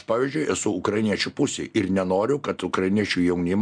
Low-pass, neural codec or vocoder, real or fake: 9.9 kHz; none; real